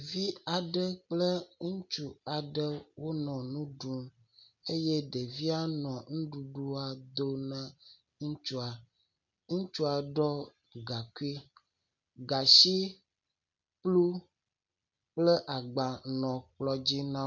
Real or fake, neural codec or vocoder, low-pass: real; none; 7.2 kHz